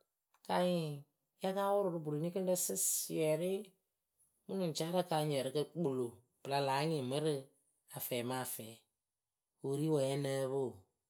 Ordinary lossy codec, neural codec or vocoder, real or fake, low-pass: none; none; real; none